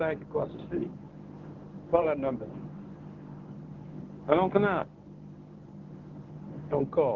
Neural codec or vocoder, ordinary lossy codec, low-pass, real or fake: codec, 24 kHz, 0.9 kbps, WavTokenizer, medium speech release version 1; Opus, 16 kbps; 7.2 kHz; fake